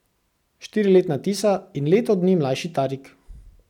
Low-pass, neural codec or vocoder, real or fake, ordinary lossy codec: 19.8 kHz; none; real; none